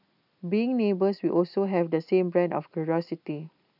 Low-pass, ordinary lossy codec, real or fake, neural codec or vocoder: 5.4 kHz; none; real; none